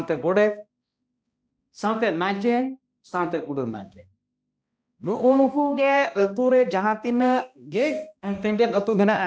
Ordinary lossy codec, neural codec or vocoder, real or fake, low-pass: none; codec, 16 kHz, 1 kbps, X-Codec, HuBERT features, trained on balanced general audio; fake; none